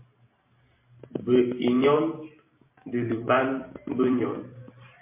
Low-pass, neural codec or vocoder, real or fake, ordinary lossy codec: 3.6 kHz; vocoder, 44.1 kHz, 128 mel bands every 512 samples, BigVGAN v2; fake; MP3, 24 kbps